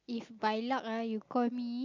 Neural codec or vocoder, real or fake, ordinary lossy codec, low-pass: none; real; MP3, 64 kbps; 7.2 kHz